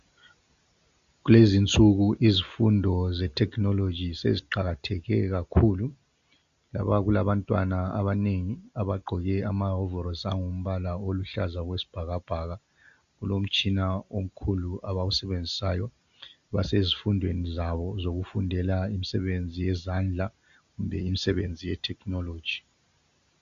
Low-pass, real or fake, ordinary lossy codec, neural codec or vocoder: 7.2 kHz; real; Opus, 64 kbps; none